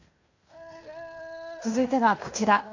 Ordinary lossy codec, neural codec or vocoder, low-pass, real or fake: AAC, 48 kbps; codec, 16 kHz in and 24 kHz out, 0.9 kbps, LongCat-Audio-Codec, fine tuned four codebook decoder; 7.2 kHz; fake